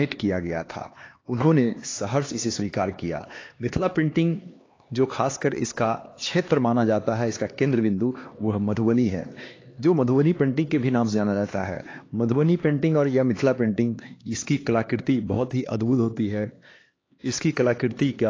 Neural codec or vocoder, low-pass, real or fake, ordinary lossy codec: codec, 16 kHz, 2 kbps, X-Codec, HuBERT features, trained on LibriSpeech; 7.2 kHz; fake; AAC, 32 kbps